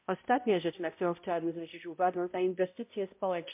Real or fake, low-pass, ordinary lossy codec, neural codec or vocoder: fake; 3.6 kHz; MP3, 32 kbps; codec, 16 kHz, 0.5 kbps, X-Codec, HuBERT features, trained on balanced general audio